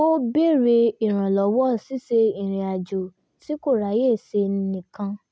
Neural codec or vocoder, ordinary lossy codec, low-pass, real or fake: none; none; none; real